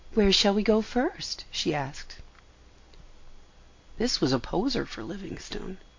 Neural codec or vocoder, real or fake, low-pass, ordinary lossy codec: none; real; 7.2 kHz; MP3, 48 kbps